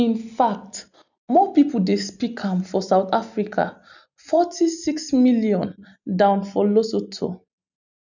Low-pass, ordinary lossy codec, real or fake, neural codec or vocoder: 7.2 kHz; none; real; none